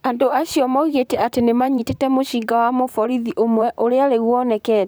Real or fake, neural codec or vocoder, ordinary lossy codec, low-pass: fake; vocoder, 44.1 kHz, 128 mel bands, Pupu-Vocoder; none; none